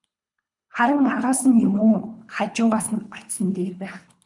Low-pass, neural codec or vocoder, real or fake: 10.8 kHz; codec, 24 kHz, 3 kbps, HILCodec; fake